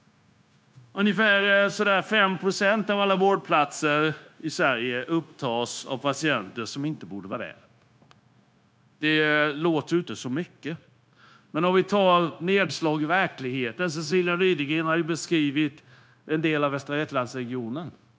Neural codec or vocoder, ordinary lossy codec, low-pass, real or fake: codec, 16 kHz, 0.9 kbps, LongCat-Audio-Codec; none; none; fake